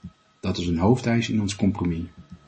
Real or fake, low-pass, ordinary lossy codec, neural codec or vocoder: real; 9.9 kHz; MP3, 32 kbps; none